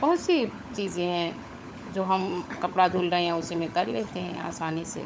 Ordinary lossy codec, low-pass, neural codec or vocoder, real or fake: none; none; codec, 16 kHz, 16 kbps, FunCodec, trained on LibriTTS, 50 frames a second; fake